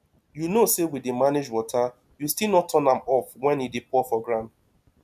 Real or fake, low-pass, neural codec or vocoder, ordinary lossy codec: real; 14.4 kHz; none; none